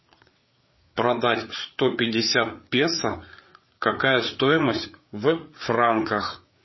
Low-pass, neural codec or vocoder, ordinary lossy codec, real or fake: 7.2 kHz; codec, 16 kHz, 4 kbps, FreqCodec, larger model; MP3, 24 kbps; fake